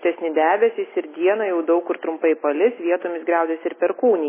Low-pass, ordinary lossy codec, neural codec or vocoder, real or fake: 3.6 kHz; MP3, 16 kbps; none; real